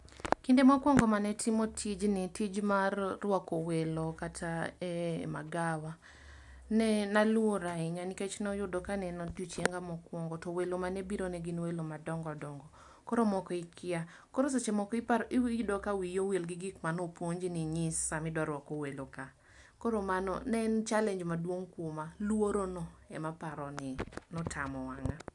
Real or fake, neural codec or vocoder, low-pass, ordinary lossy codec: real; none; 10.8 kHz; none